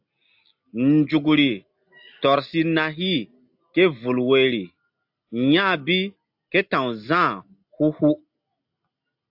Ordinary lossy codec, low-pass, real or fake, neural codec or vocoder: AAC, 48 kbps; 5.4 kHz; real; none